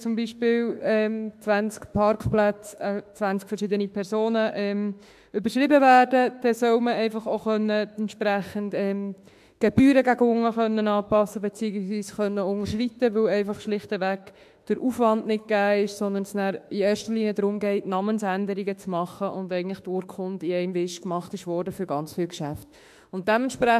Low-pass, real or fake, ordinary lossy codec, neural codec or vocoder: 14.4 kHz; fake; AAC, 96 kbps; autoencoder, 48 kHz, 32 numbers a frame, DAC-VAE, trained on Japanese speech